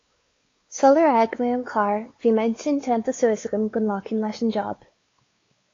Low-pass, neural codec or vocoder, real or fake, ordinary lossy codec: 7.2 kHz; codec, 16 kHz, 4 kbps, X-Codec, WavLM features, trained on Multilingual LibriSpeech; fake; AAC, 32 kbps